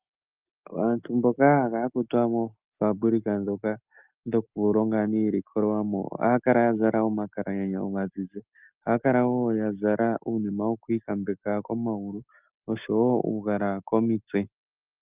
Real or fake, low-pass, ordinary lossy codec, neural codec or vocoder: real; 3.6 kHz; Opus, 24 kbps; none